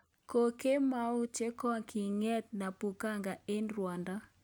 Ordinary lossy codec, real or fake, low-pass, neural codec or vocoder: none; real; none; none